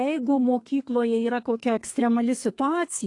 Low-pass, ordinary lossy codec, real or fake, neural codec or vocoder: 10.8 kHz; AAC, 48 kbps; fake; codec, 32 kHz, 1.9 kbps, SNAC